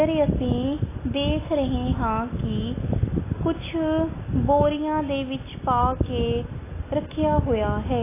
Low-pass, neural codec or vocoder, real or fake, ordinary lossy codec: 3.6 kHz; none; real; AAC, 16 kbps